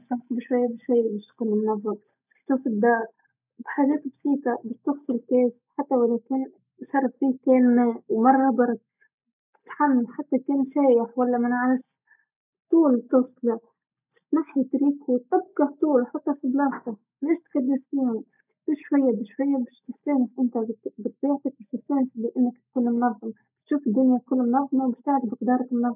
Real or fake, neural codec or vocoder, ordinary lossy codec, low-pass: real; none; none; 3.6 kHz